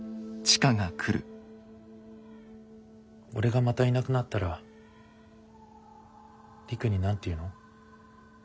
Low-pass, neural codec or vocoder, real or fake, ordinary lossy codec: none; none; real; none